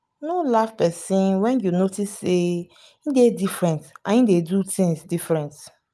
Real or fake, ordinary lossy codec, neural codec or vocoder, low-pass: real; none; none; none